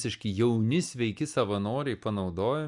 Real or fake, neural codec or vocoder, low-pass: real; none; 10.8 kHz